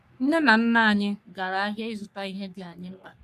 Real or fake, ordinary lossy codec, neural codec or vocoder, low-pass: fake; none; codec, 44.1 kHz, 3.4 kbps, Pupu-Codec; 14.4 kHz